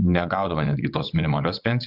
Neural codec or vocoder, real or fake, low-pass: vocoder, 22.05 kHz, 80 mel bands, WaveNeXt; fake; 5.4 kHz